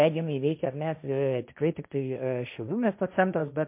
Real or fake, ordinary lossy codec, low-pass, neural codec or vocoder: fake; MP3, 32 kbps; 3.6 kHz; codec, 16 kHz, 1.1 kbps, Voila-Tokenizer